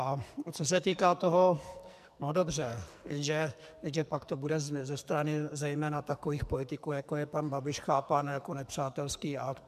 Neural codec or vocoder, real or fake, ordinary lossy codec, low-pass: codec, 44.1 kHz, 2.6 kbps, SNAC; fake; MP3, 96 kbps; 14.4 kHz